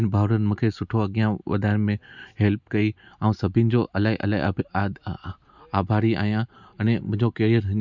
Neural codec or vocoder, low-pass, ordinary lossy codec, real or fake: none; 7.2 kHz; none; real